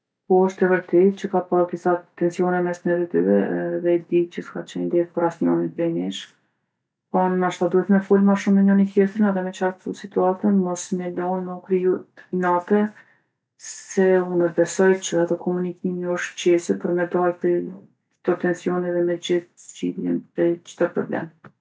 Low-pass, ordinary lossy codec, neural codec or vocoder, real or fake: none; none; none; real